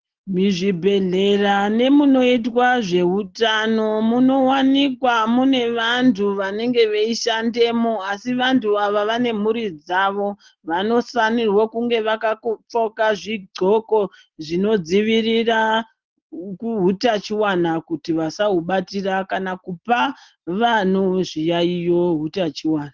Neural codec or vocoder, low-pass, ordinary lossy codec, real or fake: none; 7.2 kHz; Opus, 16 kbps; real